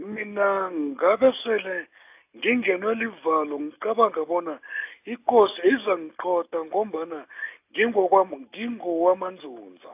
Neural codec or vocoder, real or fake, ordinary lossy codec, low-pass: none; real; AAC, 32 kbps; 3.6 kHz